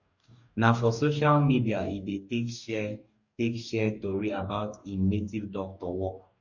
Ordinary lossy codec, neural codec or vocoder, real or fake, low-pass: none; codec, 44.1 kHz, 2.6 kbps, DAC; fake; 7.2 kHz